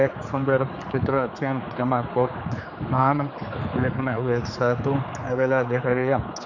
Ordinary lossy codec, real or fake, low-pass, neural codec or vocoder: none; fake; 7.2 kHz; codec, 16 kHz, 4 kbps, X-Codec, HuBERT features, trained on general audio